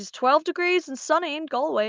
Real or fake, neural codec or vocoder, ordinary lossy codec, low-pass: real; none; Opus, 24 kbps; 7.2 kHz